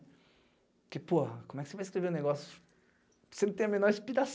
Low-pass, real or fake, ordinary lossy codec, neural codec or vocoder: none; real; none; none